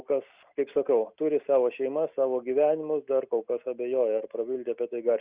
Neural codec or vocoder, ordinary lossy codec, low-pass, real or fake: none; Opus, 64 kbps; 3.6 kHz; real